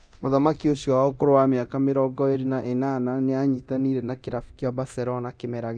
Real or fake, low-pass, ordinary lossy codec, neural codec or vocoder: fake; 9.9 kHz; none; codec, 24 kHz, 0.9 kbps, DualCodec